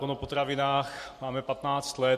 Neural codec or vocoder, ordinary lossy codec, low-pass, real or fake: none; MP3, 64 kbps; 14.4 kHz; real